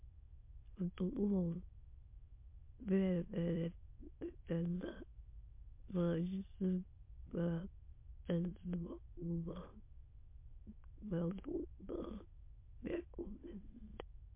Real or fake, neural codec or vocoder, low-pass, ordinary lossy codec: fake; autoencoder, 22.05 kHz, a latent of 192 numbers a frame, VITS, trained on many speakers; 3.6 kHz; MP3, 24 kbps